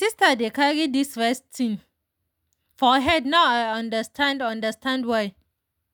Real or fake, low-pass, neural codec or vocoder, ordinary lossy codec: real; none; none; none